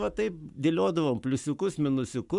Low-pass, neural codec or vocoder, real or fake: 10.8 kHz; codec, 44.1 kHz, 7.8 kbps, Pupu-Codec; fake